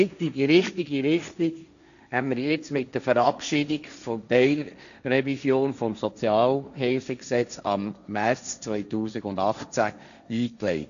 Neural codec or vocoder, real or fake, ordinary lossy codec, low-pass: codec, 16 kHz, 1.1 kbps, Voila-Tokenizer; fake; none; 7.2 kHz